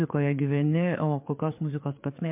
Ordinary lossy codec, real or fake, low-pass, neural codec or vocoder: MP3, 32 kbps; fake; 3.6 kHz; codec, 16 kHz, 4 kbps, FreqCodec, larger model